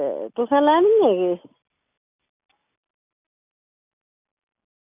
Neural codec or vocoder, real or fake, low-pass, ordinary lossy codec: none; real; 3.6 kHz; none